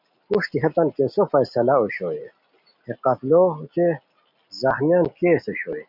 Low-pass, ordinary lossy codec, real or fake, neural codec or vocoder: 5.4 kHz; MP3, 48 kbps; real; none